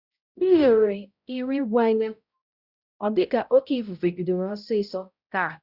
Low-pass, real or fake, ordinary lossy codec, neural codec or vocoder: 5.4 kHz; fake; Opus, 64 kbps; codec, 16 kHz, 0.5 kbps, X-Codec, HuBERT features, trained on balanced general audio